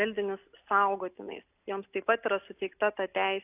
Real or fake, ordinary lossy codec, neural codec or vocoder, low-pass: real; AAC, 32 kbps; none; 3.6 kHz